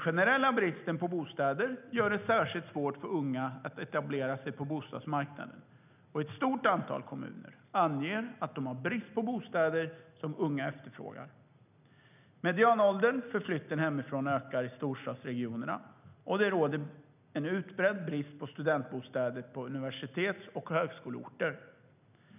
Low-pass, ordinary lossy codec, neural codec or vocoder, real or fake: 3.6 kHz; none; none; real